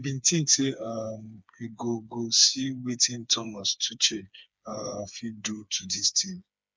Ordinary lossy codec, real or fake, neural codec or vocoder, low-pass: none; fake; codec, 16 kHz, 4 kbps, FreqCodec, smaller model; none